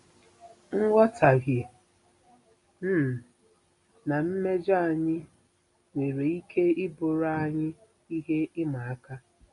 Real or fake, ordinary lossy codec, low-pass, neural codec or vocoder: real; AAC, 48 kbps; 10.8 kHz; none